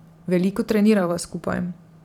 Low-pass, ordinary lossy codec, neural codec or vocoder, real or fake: 19.8 kHz; none; vocoder, 44.1 kHz, 128 mel bands every 512 samples, BigVGAN v2; fake